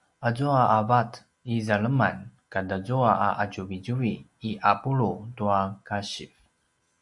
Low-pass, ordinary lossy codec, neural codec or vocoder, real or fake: 10.8 kHz; Opus, 64 kbps; none; real